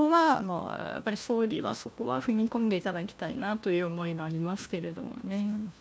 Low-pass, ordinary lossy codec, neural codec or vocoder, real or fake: none; none; codec, 16 kHz, 1 kbps, FunCodec, trained on LibriTTS, 50 frames a second; fake